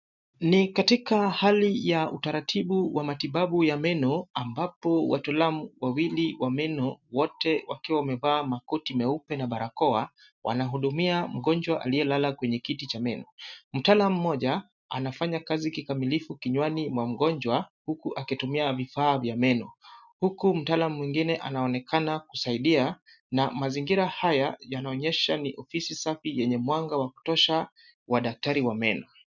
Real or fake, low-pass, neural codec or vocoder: real; 7.2 kHz; none